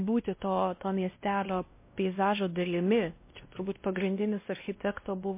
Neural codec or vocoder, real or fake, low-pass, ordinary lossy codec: codec, 16 kHz, 1 kbps, X-Codec, WavLM features, trained on Multilingual LibriSpeech; fake; 3.6 kHz; MP3, 24 kbps